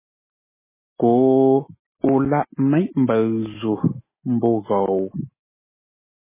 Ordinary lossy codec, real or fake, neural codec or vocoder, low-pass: MP3, 16 kbps; real; none; 3.6 kHz